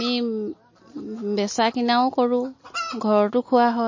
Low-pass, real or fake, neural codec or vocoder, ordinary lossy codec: 7.2 kHz; real; none; MP3, 32 kbps